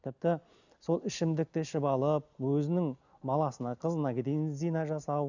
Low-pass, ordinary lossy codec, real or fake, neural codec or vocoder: 7.2 kHz; none; real; none